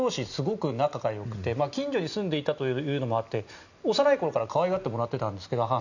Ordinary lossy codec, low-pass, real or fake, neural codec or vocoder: none; 7.2 kHz; real; none